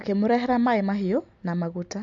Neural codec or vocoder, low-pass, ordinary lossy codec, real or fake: none; 7.2 kHz; AAC, 64 kbps; real